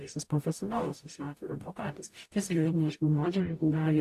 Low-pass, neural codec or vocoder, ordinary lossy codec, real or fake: 14.4 kHz; codec, 44.1 kHz, 0.9 kbps, DAC; AAC, 64 kbps; fake